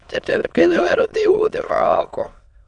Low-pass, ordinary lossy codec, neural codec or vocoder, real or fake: 9.9 kHz; none; autoencoder, 22.05 kHz, a latent of 192 numbers a frame, VITS, trained on many speakers; fake